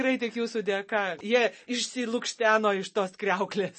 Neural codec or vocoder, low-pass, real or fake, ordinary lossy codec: none; 10.8 kHz; real; MP3, 32 kbps